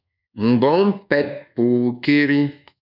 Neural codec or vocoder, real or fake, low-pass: codec, 24 kHz, 1.2 kbps, DualCodec; fake; 5.4 kHz